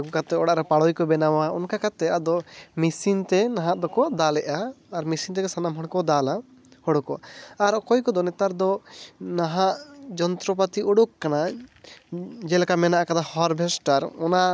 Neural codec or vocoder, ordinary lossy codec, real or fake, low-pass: none; none; real; none